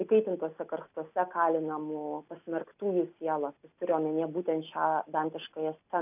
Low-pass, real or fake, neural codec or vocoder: 3.6 kHz; real; none